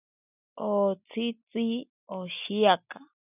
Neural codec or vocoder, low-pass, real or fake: none; 3.6 kHz; real